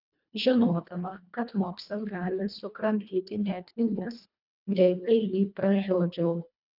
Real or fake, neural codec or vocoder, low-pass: fake; codec, 24 kHz, 1.5 kbps, HILCodec; 5.4 kHz